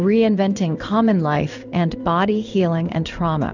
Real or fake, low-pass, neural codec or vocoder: fake; 7.2 kHz; codec, 16 kHz in and 24 kHz out, 1 kbps, XY-Tokenizer